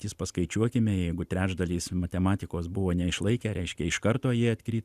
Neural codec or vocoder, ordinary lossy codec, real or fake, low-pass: none; Opus, 64 kbps; real; 14.4 kHz